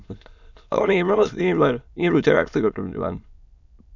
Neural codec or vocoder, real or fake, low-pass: autoencoder, 22.05 kHz, a latent of 192 numbers a frame, VITS, trained on many speakers; fake; 7.2 kHz